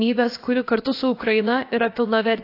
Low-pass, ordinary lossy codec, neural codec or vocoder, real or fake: 5.4 kHz; AAC, 32 kbps; codec, 16 kHz, about 1 kbps, DyCAST, with the encoder's durations; fake